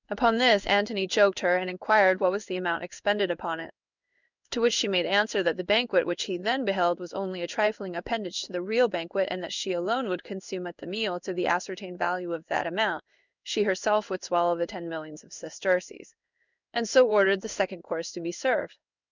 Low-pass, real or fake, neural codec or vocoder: 7.2 kHz; fake; codec, 16 kHz in and 24 kHz out, 1 kbps, XY-Tokenizer